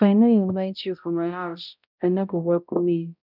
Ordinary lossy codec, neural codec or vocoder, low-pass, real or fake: none; codec, 16 kHz, 0.5 kbps, X-Codec, HuBERT features, trained on balanced general audio; 5.4 kHz; fake